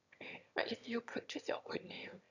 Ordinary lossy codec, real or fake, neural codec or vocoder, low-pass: none; fake; autoencoder, 22.05 kHz, a latent of 192 numbers a frame, VITS, trained on one speaker; 7.2 kHz